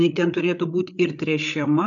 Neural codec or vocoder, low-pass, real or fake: codec, 16 kHz, 8 kbps, FreqCodec, larger model; 7.2 kHz; fake